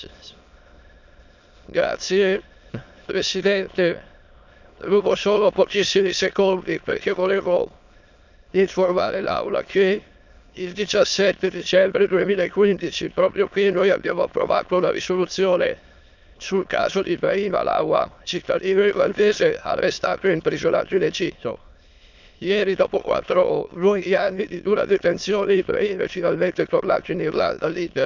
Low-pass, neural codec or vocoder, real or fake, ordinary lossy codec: 7.2 kHz; autoencoder, 22.05 kHz, a latent of 192 numbers a frame, VITS, trained on many speakers; fake; none